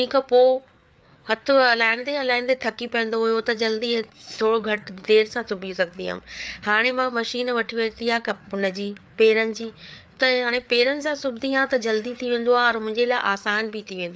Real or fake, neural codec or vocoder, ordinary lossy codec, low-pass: fake; codec, 16 kHz, 4 kbps, FreqCodec, larger model; none; none